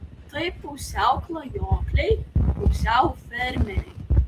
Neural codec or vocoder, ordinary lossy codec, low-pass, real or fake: none; Opus, 24 kbps; 14.4 kHz; real